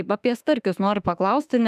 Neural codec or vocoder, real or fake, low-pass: autoencoder, 48 kHz, 32 numbers a frame, DAC-VAE, trained on Japanese speech; fake; 14.4 kHz